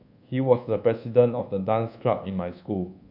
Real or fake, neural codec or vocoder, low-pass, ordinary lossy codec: fake; codec, 24 kHz, 1.2 kbps, DualCodec; 5.4 kHz; AAC, 48 kbps